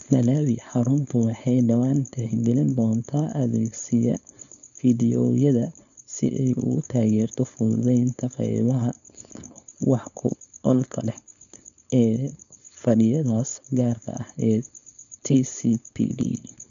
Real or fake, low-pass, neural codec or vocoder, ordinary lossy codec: fake; 7.2 kHz; codec, 16 kHz, 4.8 kbps, FACodec; none